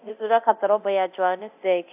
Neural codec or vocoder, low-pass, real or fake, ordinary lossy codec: codec, 24 kHz, 0.9 kbps, DualCodec; 3.6 kHz; fake; AAC, 32 kbps